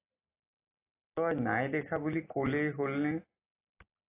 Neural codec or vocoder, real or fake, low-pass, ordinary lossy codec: none; real; 3.6 kHz; AAC, 24 kbps